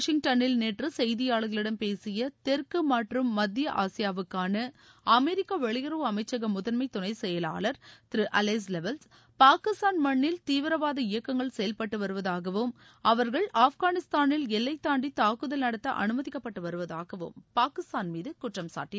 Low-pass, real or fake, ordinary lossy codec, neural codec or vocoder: none; real; none; none